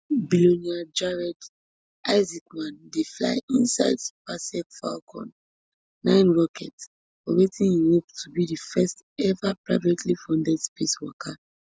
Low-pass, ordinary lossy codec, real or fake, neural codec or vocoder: none; none; real; none